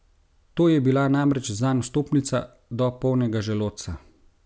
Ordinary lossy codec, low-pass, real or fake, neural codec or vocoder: none; none; real; none